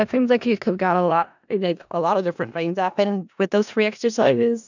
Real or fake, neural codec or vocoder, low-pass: fake; codec, 16 kHz in and 24 kHz out, 0.4 kbps, LongCat-Audio-Codec, four codebook decoder; 7.2 kHz